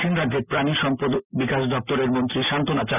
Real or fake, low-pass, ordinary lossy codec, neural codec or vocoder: real; 3.6 kHz; none; none